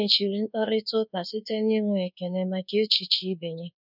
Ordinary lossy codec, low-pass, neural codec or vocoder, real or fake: none; 5.4 kHz; codec, 24 kHz, 1.2 kbps, DualCodec; fake